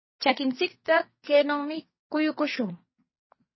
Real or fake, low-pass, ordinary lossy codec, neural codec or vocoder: fake; 7.2 kHz; MP3, 24 kbps; codec, 44.1 kHz, 2.6 kbps, SNAC